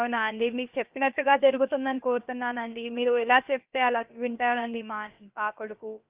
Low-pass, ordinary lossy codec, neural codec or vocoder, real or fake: 3.6 kHz; Opus, 32 kbps; codec, 16 kHz, about 1 kbps, DyCAST, with the encoder's durations; fake